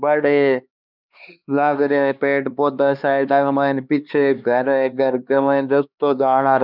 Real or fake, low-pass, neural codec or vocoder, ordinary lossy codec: fake; 5.4 kHz; codec, 16 kHz, 2 kbps, X-Codec, HuBERT features, trained on LibriSpeech; none